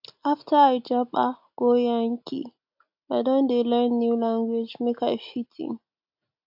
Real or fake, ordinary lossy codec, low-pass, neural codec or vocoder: real; AAC, 48 kbps; 5.4 kHz; none